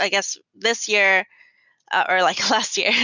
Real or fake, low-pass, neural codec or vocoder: real; 7.2 kHz; none